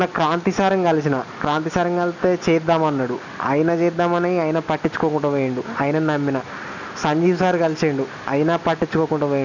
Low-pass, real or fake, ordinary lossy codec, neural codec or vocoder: 7.2 kHz; real; none; none